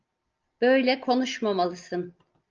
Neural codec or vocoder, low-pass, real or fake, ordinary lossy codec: none; 7.2 kHz; real; Opus, 24 kbps